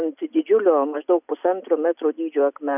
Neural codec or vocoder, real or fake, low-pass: none; real; 3.6 kHz